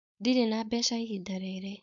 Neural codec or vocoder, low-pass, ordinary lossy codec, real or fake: codec, 16 kHz, 4.8 kbps, FACodec; 7.2 kHz; none; fake